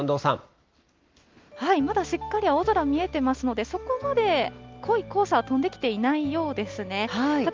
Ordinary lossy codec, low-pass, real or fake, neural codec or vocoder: Opus, 32 kbps; 7.2 kHz; real; none